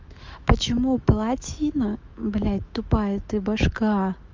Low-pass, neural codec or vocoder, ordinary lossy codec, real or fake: 7.2 kHz; autoencoder, 48 kHz, 128 numbers a frame, DAC-VAE, trained on Japanese speech; Opus, 32 kbps; fake